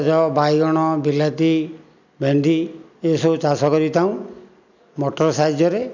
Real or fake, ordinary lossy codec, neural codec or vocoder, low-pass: real; none; none; 7.2 kHz